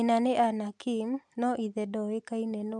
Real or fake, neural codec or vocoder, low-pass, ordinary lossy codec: real; none; 10.8 kHz; none